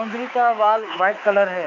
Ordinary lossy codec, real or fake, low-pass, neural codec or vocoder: none; fake; 7.2 kHz; codec, 16 kHz, 4 kbps, X-Codec, HuBERT features, trained on balanced general audio